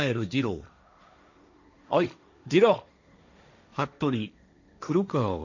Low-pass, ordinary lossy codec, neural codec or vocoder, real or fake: 7.2 kHz; none; codec, 16 kHz, 1.1 kbps, Voila-Tokenizer; fake